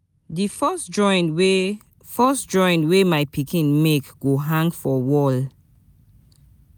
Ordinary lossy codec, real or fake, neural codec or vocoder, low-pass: none; real; none; none